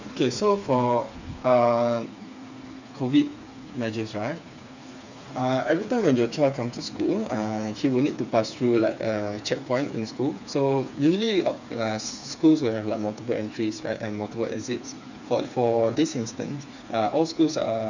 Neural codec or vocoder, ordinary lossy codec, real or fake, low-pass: codec, 16 kHz, 4 kbps, FreqCodec, smaller model; none; fake; 7.2 kHz